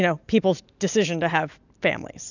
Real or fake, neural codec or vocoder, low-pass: real; none; 7.2 kHz